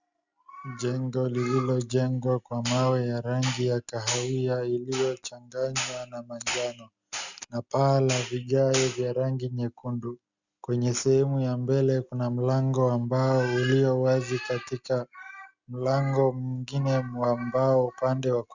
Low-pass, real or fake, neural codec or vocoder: 7.2 kHz; real; none